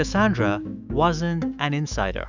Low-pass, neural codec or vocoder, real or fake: 7.2 kHz; autoencoder, 48 kHz, 128 numbers a frame, DAC-VAE, trained on Japanese speech; fake